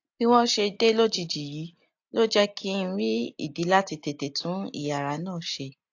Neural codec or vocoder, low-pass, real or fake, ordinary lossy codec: none; 7.2 kHz; real; AAC, 48 kbps